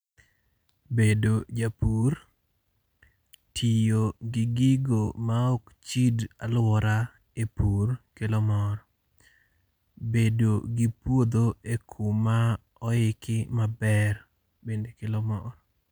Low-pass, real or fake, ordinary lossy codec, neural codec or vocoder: none; real; none; none